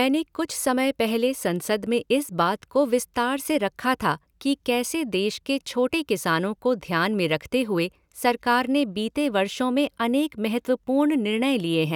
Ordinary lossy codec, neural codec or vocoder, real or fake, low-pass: none; none; real; 19.8 kHz